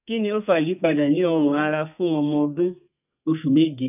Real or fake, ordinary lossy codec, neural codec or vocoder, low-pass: fake; none; codec, 32 kHz, 1.9 kbps, SNAC; 3.6 kHz